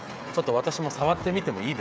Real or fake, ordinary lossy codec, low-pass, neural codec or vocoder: fake; none; none; codec, 16 kHz, 16 kbps, FreqCodec, smaller model